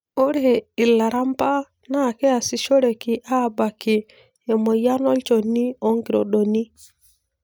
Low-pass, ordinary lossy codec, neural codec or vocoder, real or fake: none; none; none; real